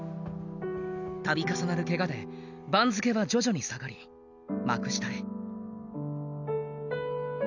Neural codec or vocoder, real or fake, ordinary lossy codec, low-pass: none; real; none; 7.2 kHz